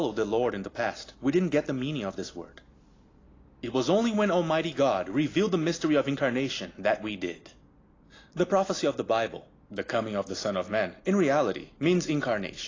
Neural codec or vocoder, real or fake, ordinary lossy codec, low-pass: none; real; AAC, 32 kbps; 7.2 kHz